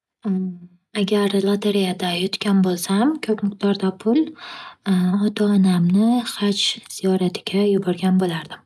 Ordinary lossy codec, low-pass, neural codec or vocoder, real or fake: none; none; none; real